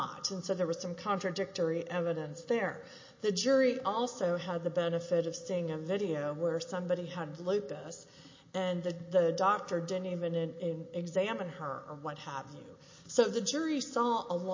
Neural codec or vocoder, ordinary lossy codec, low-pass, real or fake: none; MP3, 32 kbps; 7.2 kHz; real